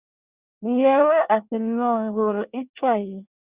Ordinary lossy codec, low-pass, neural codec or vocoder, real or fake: Opus, 64 kbps; 3.6 kHz; codec, 24 kHz, 1 kbps, SNAC; fake